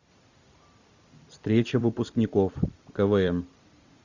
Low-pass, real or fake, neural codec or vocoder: 7.2 kHz; fake; vocoder, 44.1 kHz, 128 mel bands every 256 samples, BigVGAN v2